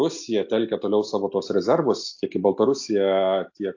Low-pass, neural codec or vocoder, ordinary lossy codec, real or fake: 7.2 kHz; none; AAC, 48 kbps; real